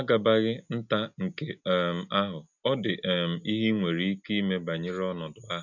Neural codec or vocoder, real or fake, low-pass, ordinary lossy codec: none; real; 7.2 kHz; none